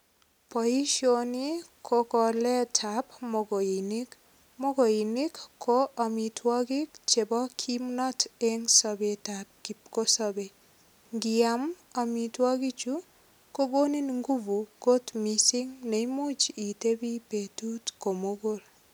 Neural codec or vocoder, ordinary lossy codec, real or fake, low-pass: none; none; real; none